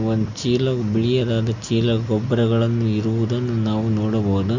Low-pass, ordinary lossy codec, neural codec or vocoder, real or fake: 7.2 kHz; Opus, 64 kbps; none; real